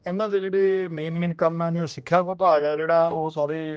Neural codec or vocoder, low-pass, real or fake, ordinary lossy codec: codec, 16 kHz, 1 kbps, X-Codec, HuBERT features, trained on general audio; none; fake; none